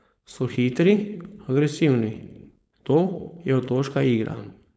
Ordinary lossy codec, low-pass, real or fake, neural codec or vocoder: none; none; fake; codec, 16 kHz, 4.8 kbps, FACodec